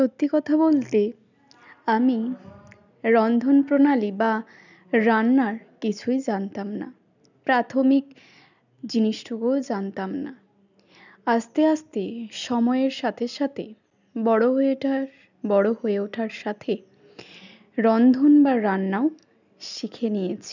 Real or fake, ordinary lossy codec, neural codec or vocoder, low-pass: real; none; none; 7.2 kHz